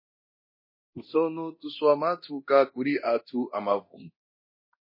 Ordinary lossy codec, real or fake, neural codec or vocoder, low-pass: MP3, 24 kbps; fake; codec, 24 kHz, 0.9 kbps, DualCodec; 5.4 kHz